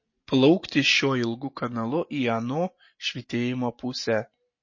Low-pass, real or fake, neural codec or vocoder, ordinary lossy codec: 7.2 kHz; real; none; MP3, 32 kbps